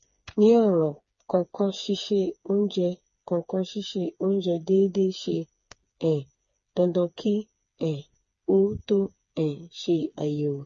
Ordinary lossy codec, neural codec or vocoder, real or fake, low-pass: MP3, 32 kbps; codec, 16 kHz, 4 kbps, FreqCodec, smaller model; fake; 7.2 kHz